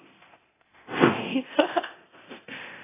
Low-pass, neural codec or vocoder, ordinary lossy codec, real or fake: 3.6 kHz; codec, 24 kHz, 0.9 kbps, DualCodec; AAC, 16 kbps; fake